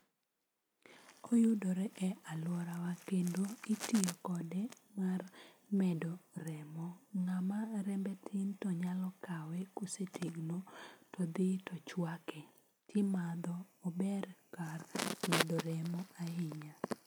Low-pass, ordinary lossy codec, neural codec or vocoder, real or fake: none; none; none; real